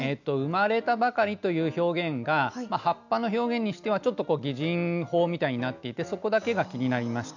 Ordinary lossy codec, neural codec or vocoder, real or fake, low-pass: none; none; real; 7.2 kHz